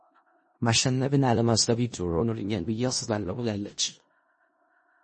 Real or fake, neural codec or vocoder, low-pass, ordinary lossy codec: fake; codec, 16 kHz in and 24 kHz out, 0.4 kbps, LongCat-Audio-Codec, four codebook decoder; 10.8 kHz; MP3, 32 kbps